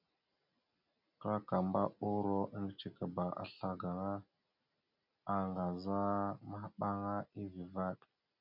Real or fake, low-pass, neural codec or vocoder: real; 5.4 kHz; none